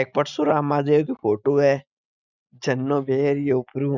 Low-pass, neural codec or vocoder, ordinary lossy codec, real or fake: 7.2 kHz; none; none; real